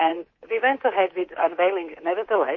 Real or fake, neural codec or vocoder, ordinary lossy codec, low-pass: fake; codec, 24 kHz, 3.1 kbps, DualCodec; MP3, 32 kbps; 7.2 kHz